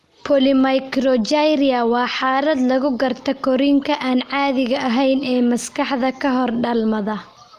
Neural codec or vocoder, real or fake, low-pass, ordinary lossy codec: none; real; 14.4 kHz; Opus, 32 kbps